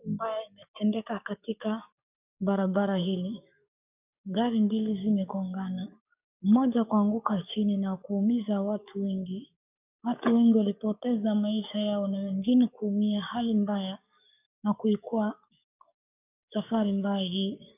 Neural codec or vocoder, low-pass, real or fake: codec, 44.1 kHz, 7.8 kbps, DAC; 3.6 kHz; fake